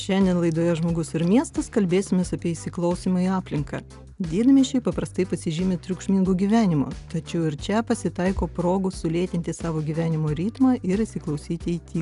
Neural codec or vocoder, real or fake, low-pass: none; real; 10.8 kHz